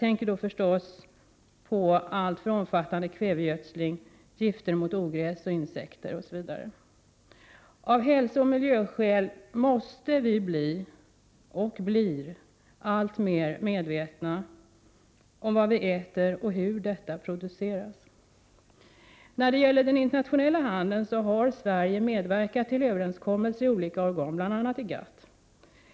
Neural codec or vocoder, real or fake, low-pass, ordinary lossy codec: none; real; none; none